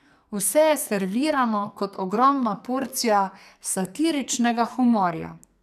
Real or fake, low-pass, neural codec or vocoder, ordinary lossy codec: fake; 14.4 kHz; codec, 44.1 kHz, 2.6 kbps, SNAC; none